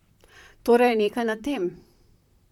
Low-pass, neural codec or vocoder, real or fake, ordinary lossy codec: 19.8 kHz; vocoder, 44.1 kHz, 128 mel bands, Pupu-Vocoder; fake; none